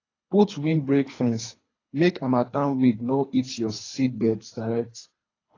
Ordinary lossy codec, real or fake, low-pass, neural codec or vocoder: AAC, 32 kbps; fake; 7.2 kHz; codec, 24 kHz, 3 kbps, HILCodec